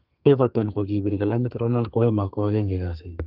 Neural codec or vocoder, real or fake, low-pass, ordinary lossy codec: codec, 32 kHz, 1.9 kbps, SNAC; fake; 5.4 kHz; Opus, 24 kbps